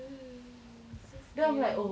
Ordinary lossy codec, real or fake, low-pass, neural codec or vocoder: none; real; none; none